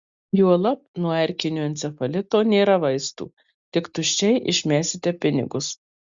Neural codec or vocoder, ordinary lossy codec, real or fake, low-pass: none; Opus, 64 kbps; real; 7.2 kHz